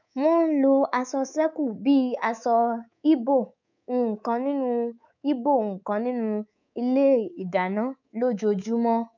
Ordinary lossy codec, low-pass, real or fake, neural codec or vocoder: none; 7.2 kHz; fake; codec, 24 kHz, 3.1 kbps, DualCodec